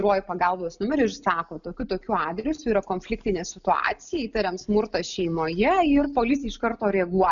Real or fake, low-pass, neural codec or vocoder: real; 7.2 kHz; none